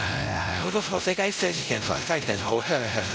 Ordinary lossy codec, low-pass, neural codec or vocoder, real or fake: none; none; codec, 16 kHz, 0.5 kbps, X-Codec, WavLM features, trained on Multilingual LibriSpeech; fake